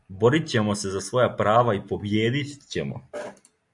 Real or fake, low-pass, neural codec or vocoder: real; 10.8 kHz; none